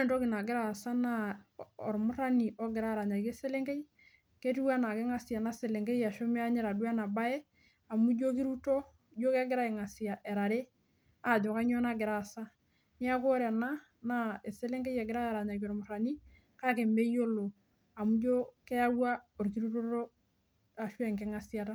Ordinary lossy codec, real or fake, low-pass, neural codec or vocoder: none; real; none; none